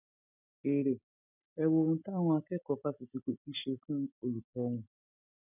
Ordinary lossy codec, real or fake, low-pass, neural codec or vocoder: none; real; 3.6 kHz; none